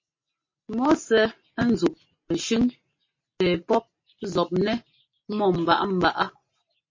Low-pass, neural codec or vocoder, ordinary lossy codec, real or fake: 7.2 kHz; none; MP3, 32 kbps; real